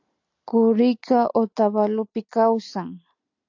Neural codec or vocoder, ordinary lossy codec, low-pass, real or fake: none; AAC, 48 kbps; 7.2 kHz; real